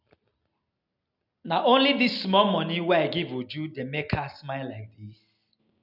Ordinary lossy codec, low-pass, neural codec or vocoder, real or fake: none; 5.4 kHz; none; real